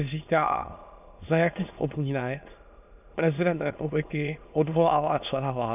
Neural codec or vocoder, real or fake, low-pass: autoencoder, 22.05 kHz, a latent of 192 numbers a frame, VITS, trained on many speakers; fake; 3.6 kHz